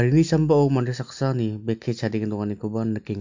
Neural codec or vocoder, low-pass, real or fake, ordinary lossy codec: none; 7.2 kHz; real; MP3, 48 kbps